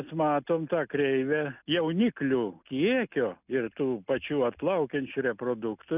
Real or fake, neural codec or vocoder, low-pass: real; none; 3.6 kHz